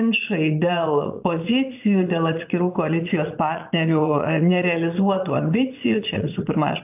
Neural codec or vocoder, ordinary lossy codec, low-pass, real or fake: vocoder, 22.05 kHz, 80 mel bands, Vocos; AAC, 32 kbps; 3.6 kHz; fake